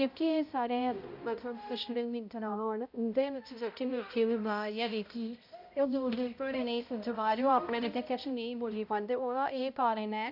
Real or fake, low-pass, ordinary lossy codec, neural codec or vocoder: fake; 5.4 kHz; none; codec, 16 kHz, 0.5 kbps, X-Codec, HuBERT features, trained on balanced general audio